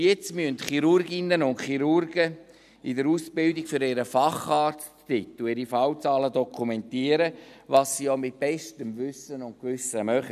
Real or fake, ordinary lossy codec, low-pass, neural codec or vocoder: real; none; 14.4 kHz; none